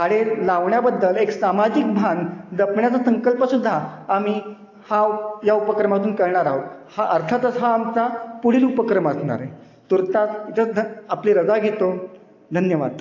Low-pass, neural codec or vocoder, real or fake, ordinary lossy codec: 7.2 kHz; none; real; AAC, 48 kbps